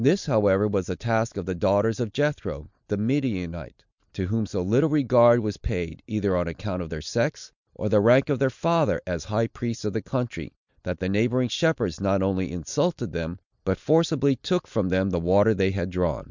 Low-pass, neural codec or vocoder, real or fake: 7.2 kHz; none; real